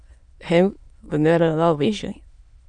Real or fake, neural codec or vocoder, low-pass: fake; autoencoder, 22.05 kHz, a latent of 192 numbers a frame, VITS, trained on many speakers; 9.9 kHz